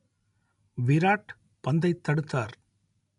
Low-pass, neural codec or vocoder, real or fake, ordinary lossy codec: 10.8 kHz; none; real; none